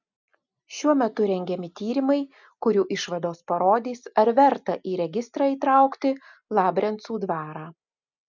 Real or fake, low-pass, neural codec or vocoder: real; 7.2 kHz; none